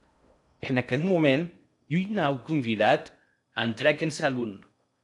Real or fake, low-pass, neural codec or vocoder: fake; 10.8 kHz; codec, 16 kHz in and 24 kHz out, 0.6 kbps, FocalCodec, streaming, 2048 codes